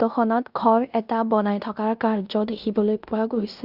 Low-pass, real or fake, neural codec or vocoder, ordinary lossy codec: 5.4 kHz; fake; codec, 16 kHz in and 24 kHz out, 0.9 kbps, LongCat-Audio-Codec, fine tuned four codebook decoder; Opus, 64 kbps